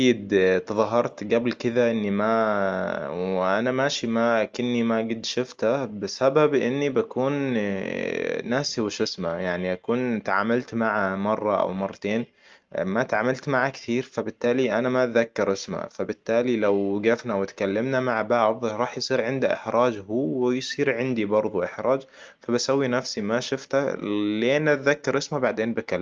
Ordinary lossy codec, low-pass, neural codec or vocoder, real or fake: Opus, 32 kbps; 7.2 kHz; none; real